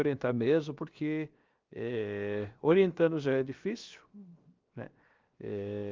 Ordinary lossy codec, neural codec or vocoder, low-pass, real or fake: Opus, 32 kbps; codec, 16 kHz, 0.7 kbps, FocalCodec; 7.2 kHz; fake